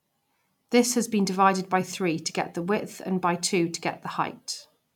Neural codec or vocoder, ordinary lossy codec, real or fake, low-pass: none; none; real; 19.8 kHz